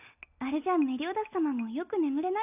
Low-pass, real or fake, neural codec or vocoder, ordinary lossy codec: 3.6 kHz; real; none; none